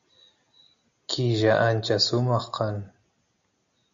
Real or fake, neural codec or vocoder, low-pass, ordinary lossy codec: real; none; 7.2 kHz; MP3, 48 kbps